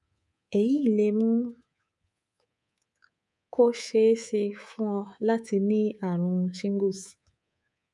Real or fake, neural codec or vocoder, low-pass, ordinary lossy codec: fake; codec, 24 kHz, 3.1 kbps, DualCodec; 10.8 kHz; none